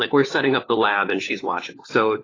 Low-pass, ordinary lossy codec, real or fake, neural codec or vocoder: 7.2 kHz; AAC, 32 kbps; fake; codec, 16 kHz, 4 kbps, FunCodec, trained on LibriTTS, 50 frames a second